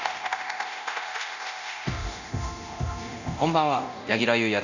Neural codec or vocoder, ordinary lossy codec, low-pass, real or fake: codec, 24 kHz, 0.9 kbps, DualCodec; none; 7.2 kHz; fake